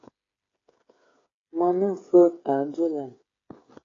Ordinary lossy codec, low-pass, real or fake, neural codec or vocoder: AAC, 32 kbps; 7.2 kHz; fake; codec, 16 kHz, 8 kbps, FreqCodec, smaller model